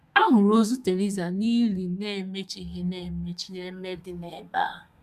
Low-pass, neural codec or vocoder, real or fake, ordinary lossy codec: 14.4 kHz; codec, 32 kHz, 1.9 kbps, SNAC; fake; none